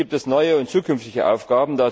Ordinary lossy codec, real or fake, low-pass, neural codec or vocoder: none; real; none; none